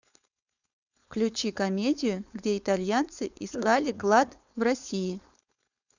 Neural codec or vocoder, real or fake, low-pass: codec, 16 kHz, 4.8 kbps, FACodec; fake; 7.2 kHz